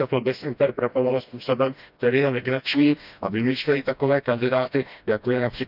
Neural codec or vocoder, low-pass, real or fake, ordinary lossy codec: codec, 16 kHz, 1 kbps, FreqCodec, smaller model; 5.4 kHz; fake; none